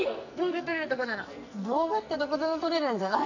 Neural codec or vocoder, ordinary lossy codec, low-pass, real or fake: codec, 44.1 kHz, 2.6 kbps, SNAC; none; 7.2 kHz; fake